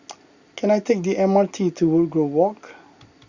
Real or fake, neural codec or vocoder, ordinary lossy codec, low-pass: real; none; Opus, 64 kbps; 7.2 kHz